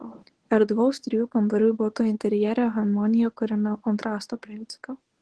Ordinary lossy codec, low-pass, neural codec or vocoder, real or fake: Opus, 16 kbps; 10.8 kHz; codec, 24 kHz, 0.9 kbps, WavTokenizer, medium speech release version 1; fake